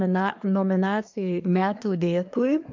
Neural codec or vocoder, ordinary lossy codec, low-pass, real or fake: codec, 24 kHz, 1 kbps, SNAC; MP3, 48 kbps; 7.2 kHz; fake